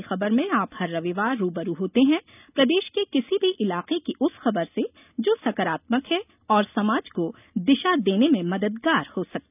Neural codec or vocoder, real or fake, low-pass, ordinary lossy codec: none; real; 3.6 kHz; none